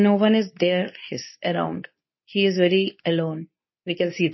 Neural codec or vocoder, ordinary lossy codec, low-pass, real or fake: codec, 24 kHz, 0.9 kbps, WavTokenizer, medium speech release version 2; MP3, 24 kbps; 7.2 kHz; fake